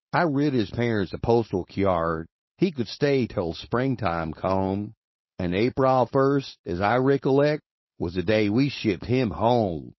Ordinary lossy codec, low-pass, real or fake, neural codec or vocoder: MP3, 24 kbps; 7.2 kHz; fake; codec, 16 kHz, 4.8 kbps, FACodec